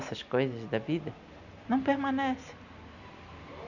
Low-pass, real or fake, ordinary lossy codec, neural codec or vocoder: 7.2 kHz; real; none; none